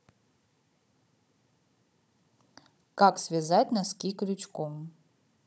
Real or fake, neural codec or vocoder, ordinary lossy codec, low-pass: fake; codec, 16 kHz, 16 kbps, FunCodec, trained on Chinese and English, 50 frames a second; none; none